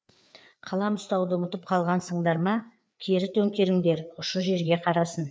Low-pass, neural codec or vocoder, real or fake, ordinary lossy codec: none; codec, 16 kHz, 4 kbps, FreqCodec, larger model; fake; none